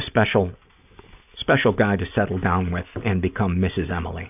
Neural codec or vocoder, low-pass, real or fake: none; 3.6 kHz; real